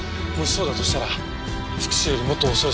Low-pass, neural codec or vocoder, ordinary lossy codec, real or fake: none; none; none; real